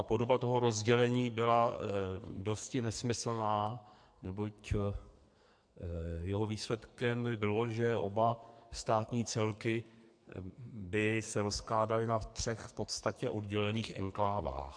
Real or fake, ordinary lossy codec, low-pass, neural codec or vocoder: fake; MP3, 64 kbps; 9.9 kHz; codec, 44.1 kHz, 2.6 kbps, SNAC